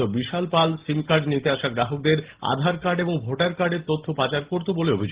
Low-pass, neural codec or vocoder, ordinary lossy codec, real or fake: 3.6 kHz; none; Opus, 16 kbps; real